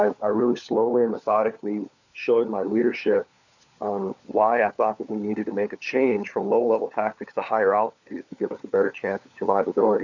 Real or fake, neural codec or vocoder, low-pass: fake; codec, 16 kHz, 4 kbps, FunCodec, trained on LibriTTS, 50 frames a second; 7.2 kHz